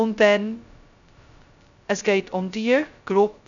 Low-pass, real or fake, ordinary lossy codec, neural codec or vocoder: 7.2 kHz; fake; none; codec, 16 kHz, 0.2 kbps, FocalCodec